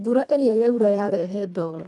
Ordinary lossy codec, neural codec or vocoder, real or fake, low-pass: none; codec, 24 kHz, 1.5 kbps, HILCodec; fake; 10.8 kHz